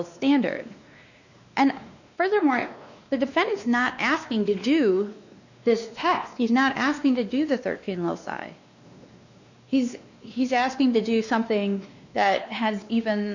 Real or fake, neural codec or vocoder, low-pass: fake; codec, 16 kHz, 2 kbps, X-Codec, WavLM features, trained on Multilingual LibriSpeech; 7.2 kHz